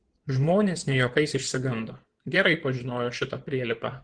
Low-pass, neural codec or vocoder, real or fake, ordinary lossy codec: 9.9 kHz; codec, 16 kHz in and 24 kHz out, 2.2 kbps, FireRedTTS-2 codec; fake; Opus, 16 kbps